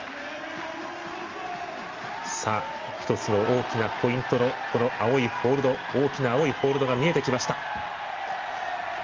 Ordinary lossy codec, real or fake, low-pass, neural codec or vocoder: Opus, 32 kbps; real; 7.2 kHz; none